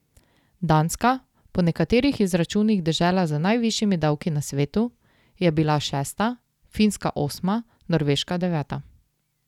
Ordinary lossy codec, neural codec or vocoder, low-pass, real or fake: none; none; 19.8 kHz; real